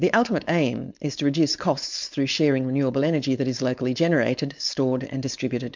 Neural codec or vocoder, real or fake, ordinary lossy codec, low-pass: codec, 16 kHz, 4.8 kbps, FACodec; fake; MP3, 64 kbps; 7.2 kHz